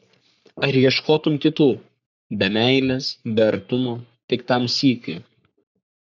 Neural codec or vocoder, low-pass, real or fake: codec, 44.1 kHz, 3.4 kbps, Pupu-Codec; 7.2 kHz; fake